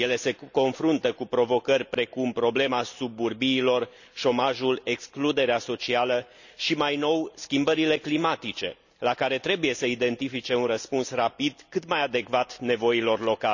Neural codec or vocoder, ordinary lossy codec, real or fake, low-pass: none; none; real; 7.2 kHz